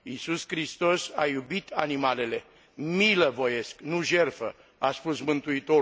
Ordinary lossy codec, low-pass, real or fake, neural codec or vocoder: none; none; real; none